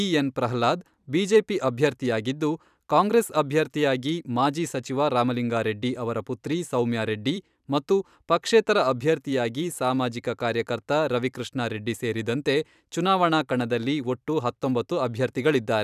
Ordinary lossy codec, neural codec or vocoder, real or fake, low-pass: none; vocoder, 44.1 kHz, 128 mel bands every 256 samples, BigVGAN v2; fake; 14.4 kHz